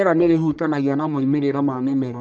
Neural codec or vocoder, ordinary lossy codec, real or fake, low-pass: codec, 44.1 kHz, 1.7 kbps, Pupu-Codec; none; fake; 9.9 kHz